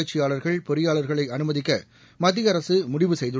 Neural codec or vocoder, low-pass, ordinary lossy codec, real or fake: none; none; none; real